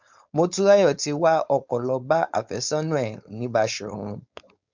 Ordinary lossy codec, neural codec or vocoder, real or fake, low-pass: MP3, 64 kbps; codec, 16 kHz, 4.8 kbps, FACodec; fake; 7.2 kHz